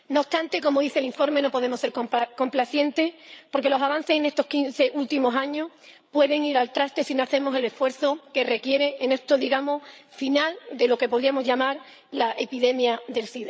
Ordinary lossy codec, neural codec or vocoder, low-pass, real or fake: none; codec, 16 kHz, 8 kbps, FreqCodec, larger model; none; fake